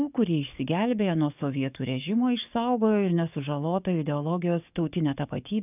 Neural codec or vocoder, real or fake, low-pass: codec, 44.1 kHz, 7.8 kbps, DAC; fake; 3.6 kHz